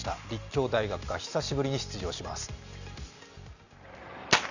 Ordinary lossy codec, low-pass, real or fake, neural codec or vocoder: none; 7.2 kHz; real; none